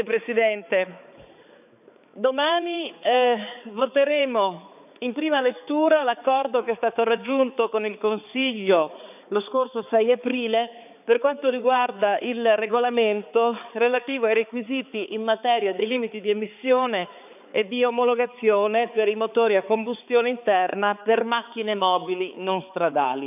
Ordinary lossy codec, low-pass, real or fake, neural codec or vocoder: none; 3.6 kHz; fake; codec, 16 kHz, 4 kbps, X-Codec, HuBERT features, trained on balanced general audio